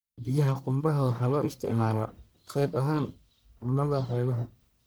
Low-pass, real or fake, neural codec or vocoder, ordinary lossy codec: none; fake; codec, 44.1 kHz, 1.7 kbps, Pupu-Codec; none